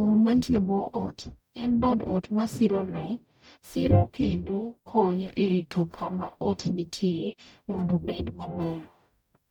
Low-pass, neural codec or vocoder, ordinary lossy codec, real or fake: 19.8 kHz; codec, 44.1 kHz, 0.9 kbps, DAC; none; fake